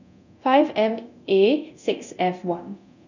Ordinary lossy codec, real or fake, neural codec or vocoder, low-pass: none; fake; codec, 24 kHz, 0.9 kbps, DualCodec; 7.2 kHz